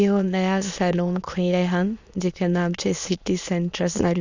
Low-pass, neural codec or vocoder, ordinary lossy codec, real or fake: 7.2 kHz; autoencoder, 22.05 kHz, a latent of 192 numbers a frame, VITS, trained on many speakers; Opus, 64 kbps; fake